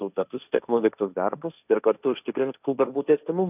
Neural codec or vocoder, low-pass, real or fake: codec, 16 kHz, 1.1 kbps, Voila-Tokenizer; 3.6 kHz; fake